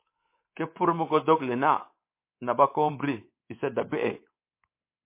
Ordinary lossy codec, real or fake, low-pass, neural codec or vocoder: MP3, 24 kbps; fake; 3.6 kHz; codec, 24 kHz, 3.1 kbps, DualCodec